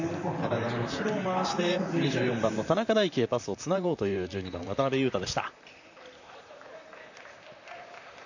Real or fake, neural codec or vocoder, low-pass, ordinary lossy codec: fake; vocoder, 44.1 kHz, 128 mel bands, Pupu-Vocoder; 7.2 kHz; none